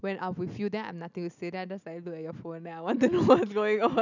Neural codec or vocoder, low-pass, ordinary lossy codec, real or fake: none; 7.2 kHz; none; real